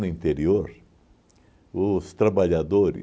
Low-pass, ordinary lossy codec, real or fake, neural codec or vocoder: none; none; real; none